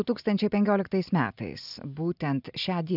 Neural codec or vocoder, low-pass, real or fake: none; 5.4 kHz; real